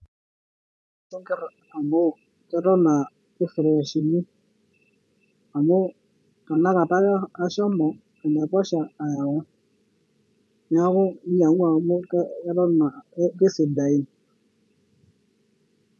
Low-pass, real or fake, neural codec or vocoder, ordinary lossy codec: none; fake; vocoder, 24 kHz, 100 mel bands, Vocos; none